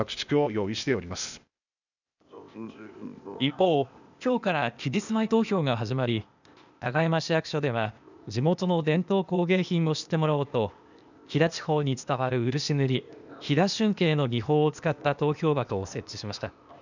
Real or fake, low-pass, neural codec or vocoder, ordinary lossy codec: fake; 7.2 kHz; codec, 16 kHz, 0.8 kbps, ZipCodec; none